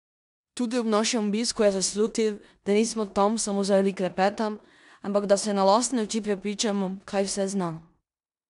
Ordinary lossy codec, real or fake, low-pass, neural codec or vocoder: none; fake; 10.8 kHz; codec, 16 kHz in and 24 kHz out, 0.9 kbps, LongCat-Audio-Codec, four codebook decoder